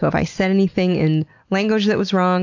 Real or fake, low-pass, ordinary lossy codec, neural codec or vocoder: real; 7.2 kHz; AAC, 48 kbps; none